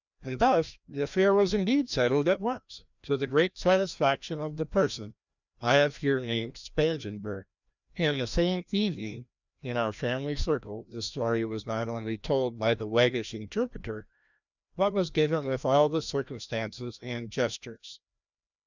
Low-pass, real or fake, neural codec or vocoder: 7.2 kHz; fake; codec, 16 kHz, 1 kbps, FreqCodec, larger model